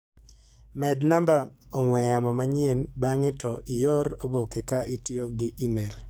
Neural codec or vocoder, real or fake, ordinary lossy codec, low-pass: codec, 44.1 kHz, 2.6 kbps, SNAC; fake; none; none